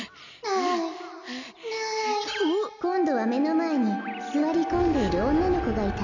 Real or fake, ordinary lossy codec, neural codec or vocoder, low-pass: real; none; none; 7.2 kHz